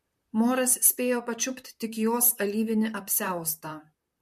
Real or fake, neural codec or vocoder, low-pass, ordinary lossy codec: fake; vocoder, 44.1 kHz, 128 mel bands, Pupu-Vocoder; 14.4 kHz; MP3, 64 kbps